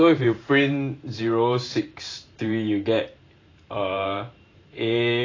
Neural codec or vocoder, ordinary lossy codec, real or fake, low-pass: vocoder, 44.1 kHz, 128 mel bands every 512 samples, BigVGAN v2; AAC, 32 kbps; fake; 7.2 kHz